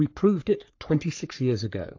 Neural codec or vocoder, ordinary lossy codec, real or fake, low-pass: codec, 44.1 kHz, 3.4 kbps, Pupu-Codec; AAC, 48 kbps; fake; 7.2 kHz